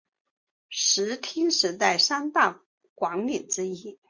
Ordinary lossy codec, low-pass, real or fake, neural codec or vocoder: MP3, 64 kbps; 7.2 kHz; real; none